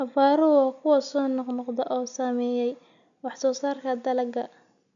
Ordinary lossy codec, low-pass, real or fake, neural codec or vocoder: none; 7.2 kHz; real; none